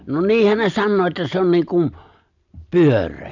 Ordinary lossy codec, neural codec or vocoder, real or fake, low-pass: none; none; real; 7.2 kHz